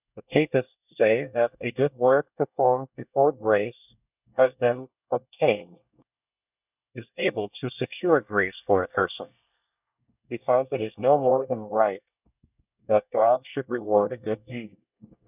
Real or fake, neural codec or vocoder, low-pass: fake; codec, 24 kHz, 1 kbps, SNAC; 3.6 kHz